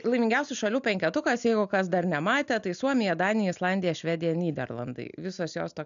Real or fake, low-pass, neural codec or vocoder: real; 7.2 kHz; none